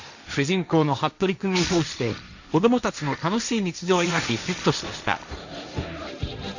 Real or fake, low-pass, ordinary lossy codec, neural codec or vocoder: fake; 7.2 kHz; none; codec, 16 kHz, 1.1 kbps, Voila-Tokenizer